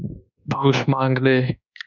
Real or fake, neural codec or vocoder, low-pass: fake; codec, 24 kHz, 1.2 kbps, DualCodec; 7.2 kHz